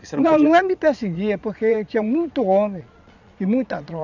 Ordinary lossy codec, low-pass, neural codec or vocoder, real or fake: none; 7.2 kHz; vocoder, 22.05 kHz, 80 mel bands, Vocos; fake